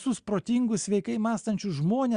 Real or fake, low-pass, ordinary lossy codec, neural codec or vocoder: real; 9.9 kHz; Opus, 64 kbps; none